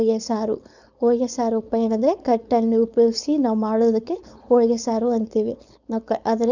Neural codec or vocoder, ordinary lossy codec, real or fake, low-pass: codec, 16 kHz, 4.8 kbps, FACodec; none; fake; 7.2 kHz